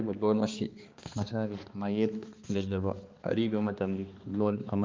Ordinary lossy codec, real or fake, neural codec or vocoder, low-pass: Opus, 24 kbps; fake; codec, 16 kHz, 2 kbps, X-Codec, HuBERT features, trained on balanced general audio; 7.2 kHz